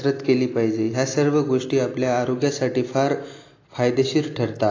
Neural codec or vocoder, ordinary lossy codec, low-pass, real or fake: none; AAC, 32 kbps; 7.2 kHz; real